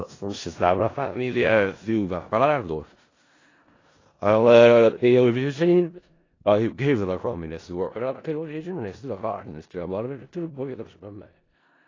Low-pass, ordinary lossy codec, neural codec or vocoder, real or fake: 7.2 kHz; AAC, 32 kbps; codec, 16 kHz in and 24 kHz out, 0.4 kbps, LongCat-Audio-Codec, four codebook decoder; fake